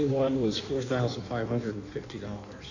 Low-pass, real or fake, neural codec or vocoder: 7.2 kHz; fake; codec, 16 kHz in and 24 kHz out, 1.1 kbps, FireRedTTS-2 codec